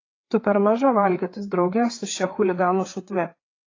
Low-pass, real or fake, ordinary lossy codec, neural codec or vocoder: 7.2 kHz; fake; AAC, 32 kbps; codec, 16 kHz, 4 kbps, FreqCodec, larger model